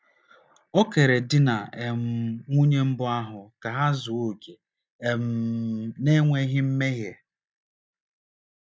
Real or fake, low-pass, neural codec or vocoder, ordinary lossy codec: real; none; none; none